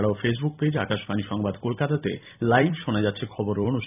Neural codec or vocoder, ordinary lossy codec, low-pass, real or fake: vocoder, 44.1 kHz, 128 mel bands every 256 samples, BigVGAN v2; none; 3.6 kHz; fake